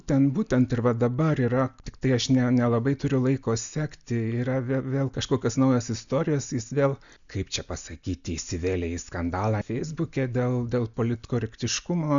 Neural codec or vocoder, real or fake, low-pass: none; real; 7.2 kHz